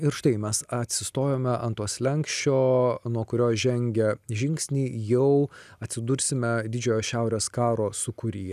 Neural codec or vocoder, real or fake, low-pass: none; real; 14.4 kHz